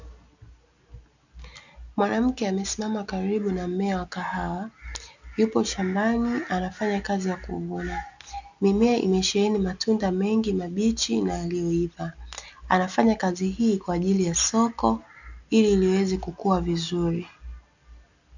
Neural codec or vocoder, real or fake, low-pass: none; real; 7.2 kHz